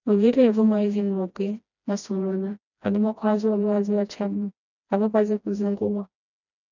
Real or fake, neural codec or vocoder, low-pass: fake; codec, 16 kHz, 1 kbps, FreqCodec, smaller model; 7.2 kHz